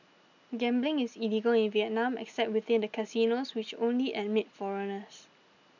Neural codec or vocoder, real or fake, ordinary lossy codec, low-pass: none; real; none; 7.2 kHz